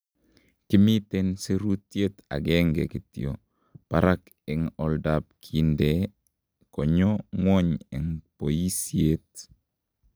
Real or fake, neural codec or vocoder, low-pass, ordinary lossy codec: real; none; none; none